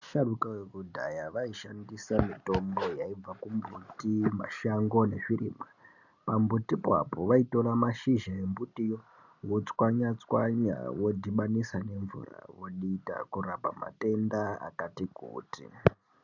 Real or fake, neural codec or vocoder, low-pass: real; none; 7.2 kHz